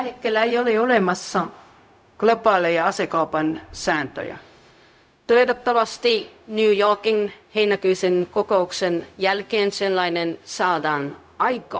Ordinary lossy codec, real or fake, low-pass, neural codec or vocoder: none; fake; none; codec, 16 kHz, 0.4 kbps, LongCat-Audio-Codec